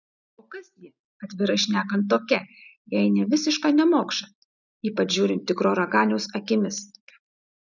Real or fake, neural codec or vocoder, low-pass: real; none; 7.2 kHz